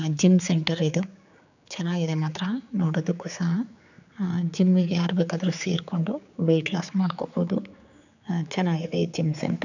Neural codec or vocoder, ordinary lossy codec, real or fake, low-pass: codec, 16 kHz, 4 kbps, X-Codec, HuBERT features, trained on general audio; none; fake; 7.2 kHz